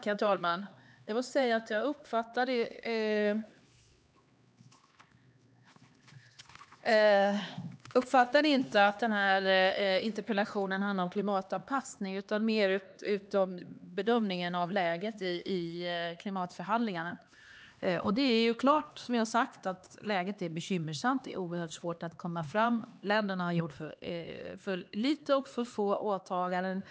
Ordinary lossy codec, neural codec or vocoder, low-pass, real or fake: none; codec, 16 kHz, 2 kbps, X-Codec, HuBERT features, trained on LibriSpeech; none; fake